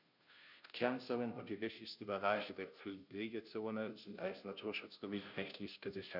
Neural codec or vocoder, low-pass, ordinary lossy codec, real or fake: codec, 16 kHz, 0.5 kbps, FunCodec, trained on Chinese and English, 25 frames a second; 5.4 kHz; none; fake